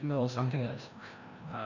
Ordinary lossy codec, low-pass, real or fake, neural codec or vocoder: none; 7.2 kHz; fake; codec, 16 kHz, 1 kbps, FreqCodec, larger model